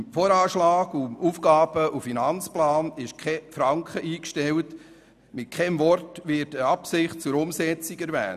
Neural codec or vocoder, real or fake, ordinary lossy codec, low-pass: none; real; none; 14.4 kHz